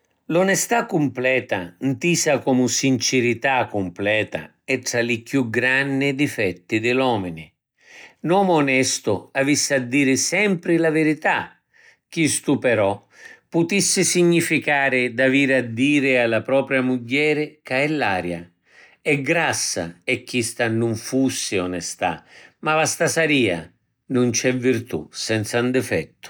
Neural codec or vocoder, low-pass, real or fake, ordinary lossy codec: none; none; real; none